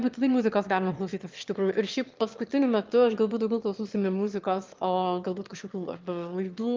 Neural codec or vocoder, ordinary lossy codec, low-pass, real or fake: autoencoder, 22.05 kHz, a latent of 192 numbers a frame, VITS, trained on one speaker; Opus, 32 kbps; 7.2 kHz; fake